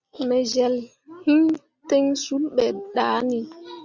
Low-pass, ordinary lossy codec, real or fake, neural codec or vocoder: 7.2 kHz; Opus, 64 kbps; real; none